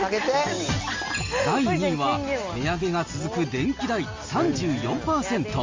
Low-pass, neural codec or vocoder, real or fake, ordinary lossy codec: 7.2 kHz; none; real; Opus, 32 kbps